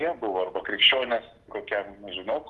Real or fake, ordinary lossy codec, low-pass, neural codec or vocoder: real; Opus, 16 kbps; 10.8 kHz; none